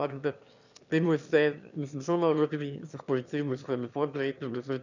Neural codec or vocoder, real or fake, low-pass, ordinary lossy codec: autoencoder, 22.05 kHz, a latent of 192 numbers a frame, VITS, trained on one speaker; fake; 7.2 kHz; none